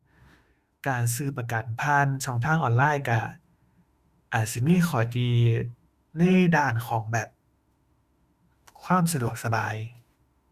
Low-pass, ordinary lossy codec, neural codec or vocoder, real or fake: 14.4 kHz; Opus, 64 kbps; autoencoder, 48 kHz, 32 numbers a frame, DAC-VAE, trained on Japanese speech; fake